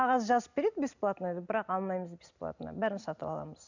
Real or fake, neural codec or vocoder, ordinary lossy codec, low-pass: real; none; none; 7.2 kHz